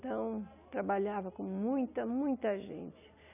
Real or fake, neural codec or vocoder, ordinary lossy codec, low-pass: real; none; none; 3.6 kHz